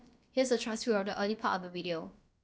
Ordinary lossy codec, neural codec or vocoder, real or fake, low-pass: none; codec, 16 kHz, about 1 kbps, DyCAST, with the encoder's durations; fake; none